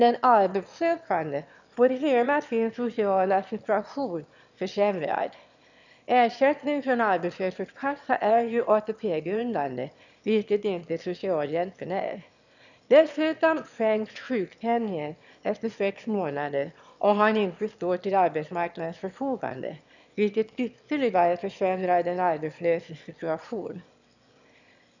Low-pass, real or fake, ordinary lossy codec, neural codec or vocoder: 7.2 kHz; fake; none; autoencoder, 22.05 kHz, a latent of 192 numbers a frame, VITS, trained on one speaker